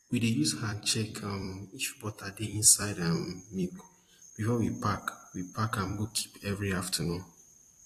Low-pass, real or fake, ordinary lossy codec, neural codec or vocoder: 14.4 kHz; fake; AAC, 48 kbps; vocoder, 44.1 kHz, 128 mel bands every 256 samples, BigVGAN v2